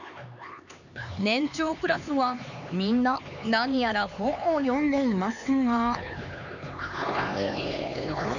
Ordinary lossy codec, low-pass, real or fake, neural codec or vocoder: none; 7.2 kHz; fake; codec, 16 kHz, 4 kbps, X-Codec, HuBERT features, trained on LibriSpeech